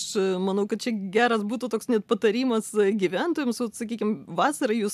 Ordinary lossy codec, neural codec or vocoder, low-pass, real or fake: AAC, 96 kbps; none; 14.4 kHz; real